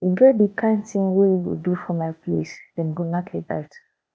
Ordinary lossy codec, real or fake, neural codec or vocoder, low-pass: none; fake; codec, 16 kHz, 0.8 kbps, ZipCodec; none